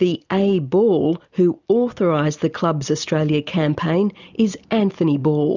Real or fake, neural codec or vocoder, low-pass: real; none; 7.2 kHz